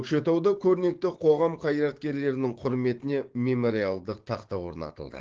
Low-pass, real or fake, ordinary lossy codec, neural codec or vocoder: 7.2 kHz; fake; Opus, 16 kbps; codec, 16 kHz, 6 kbps, DAC